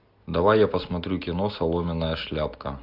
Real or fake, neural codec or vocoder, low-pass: real; none; 5.4 kHz